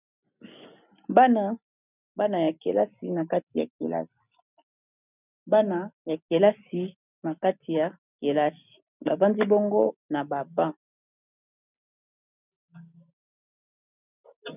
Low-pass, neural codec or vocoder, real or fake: 3.6 kHz; none; real